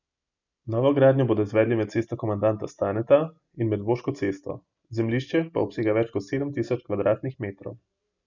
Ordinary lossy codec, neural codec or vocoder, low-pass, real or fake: none; none; 7.2 kHz; real